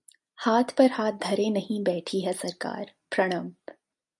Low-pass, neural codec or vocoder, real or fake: 10.8 kHz; none; real